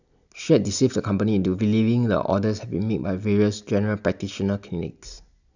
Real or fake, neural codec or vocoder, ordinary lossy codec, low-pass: real; none; none; 7.2 kHz